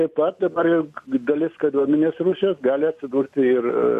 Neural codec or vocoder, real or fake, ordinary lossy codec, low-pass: none; real; MP3, 64 kbps; 14.4 kHz